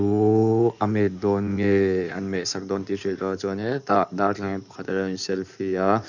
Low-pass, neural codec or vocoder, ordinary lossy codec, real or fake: 7.2 kHz; codec, 16 kHz in and 24 kHz out, 2.2 kbps, FireRedTTS-2 codec; none; fake